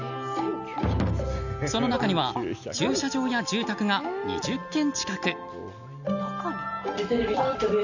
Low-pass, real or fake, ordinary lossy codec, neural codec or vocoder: 7.2 kHz; real; none; none